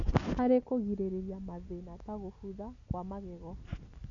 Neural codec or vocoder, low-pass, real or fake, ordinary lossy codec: none; 7.2 kHz; real; none